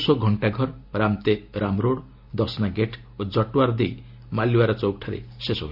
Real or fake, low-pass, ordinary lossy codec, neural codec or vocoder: real; 5.4 kHz; AAC, 48 kbps; none